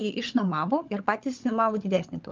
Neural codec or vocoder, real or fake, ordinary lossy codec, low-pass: codec, 16 kHz, 16 kbps, FunCodec, trained on LibriTTS, 50 frames a second; fake; Opus, 24 kbps; 7.2 kHz